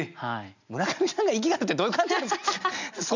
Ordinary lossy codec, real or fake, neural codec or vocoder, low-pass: none; real; none; 7.2 kHz